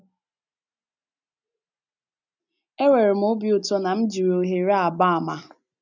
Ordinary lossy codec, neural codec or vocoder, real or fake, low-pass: none; none; real; 7.2 kHz